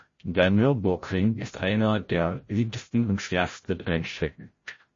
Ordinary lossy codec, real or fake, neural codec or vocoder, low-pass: MP3, 32 kbps; fake; codec, 16 kHz, 0.5 kbps, FreqCodec, larger model; 7.2 kHz